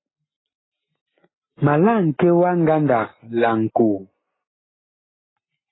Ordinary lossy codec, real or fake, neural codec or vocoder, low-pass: AAC, 16 kbps; real; none; 7.2 kHz